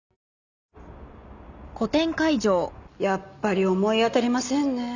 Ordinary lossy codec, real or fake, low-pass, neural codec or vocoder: none; real; 7.2 kHz; none